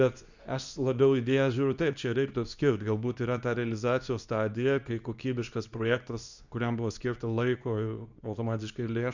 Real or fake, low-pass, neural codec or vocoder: fake; 7.2 kHz; codec, 24 kHz, 0.9 kbps, WavTokenizer, medium speech release version 2